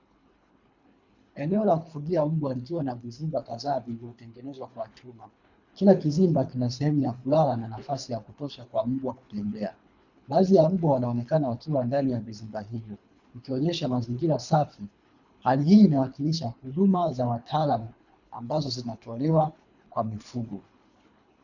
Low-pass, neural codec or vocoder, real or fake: 7.2 kHz; codec, 24 kHz, 3 kbps, HILCodec; fake